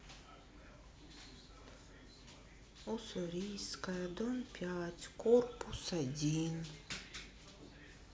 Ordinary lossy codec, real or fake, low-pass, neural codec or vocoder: none; real; none; none